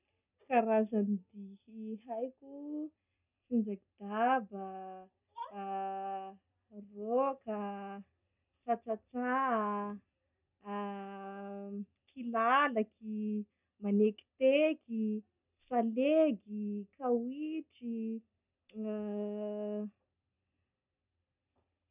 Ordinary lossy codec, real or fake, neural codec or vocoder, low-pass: none; real; none; 3.6 kHz